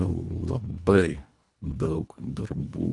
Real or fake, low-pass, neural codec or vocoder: fake; 10.8 kHz; codec, 24 kHz, 1.5 kbps, HILCodec